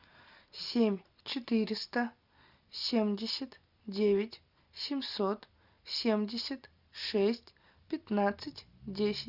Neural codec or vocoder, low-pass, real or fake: none; 5.4 kHz; real